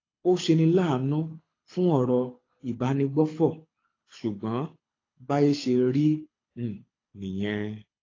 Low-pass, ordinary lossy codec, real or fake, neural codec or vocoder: 7.2 kHz; AAC, 32 kbps; fake; codec, 24 kHz, 6 kbps, HILCodec